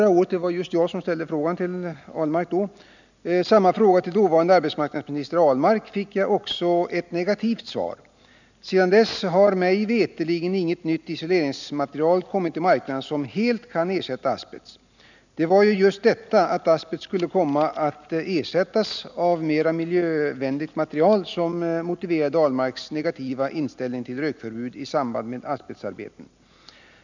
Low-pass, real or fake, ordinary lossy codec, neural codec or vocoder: 7.2 kHz; real; none; none